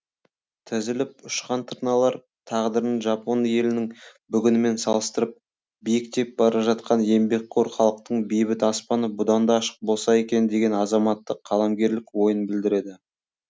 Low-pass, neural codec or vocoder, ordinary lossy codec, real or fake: none; none; none; real